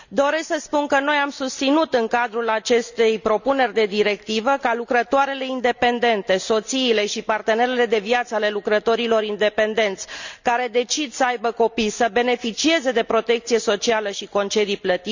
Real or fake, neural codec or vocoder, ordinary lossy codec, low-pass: real; none; none; 7.2 kHz